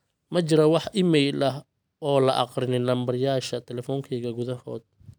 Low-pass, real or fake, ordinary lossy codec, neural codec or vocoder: none; real; none; none